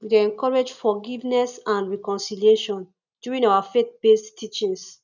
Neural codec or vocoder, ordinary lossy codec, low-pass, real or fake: none; none; 7.2 kHz; real